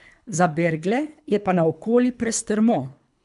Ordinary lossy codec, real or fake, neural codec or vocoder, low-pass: none; fake; codec, 24 kHz, 3 kbps, HILCodec; 10.8 kHz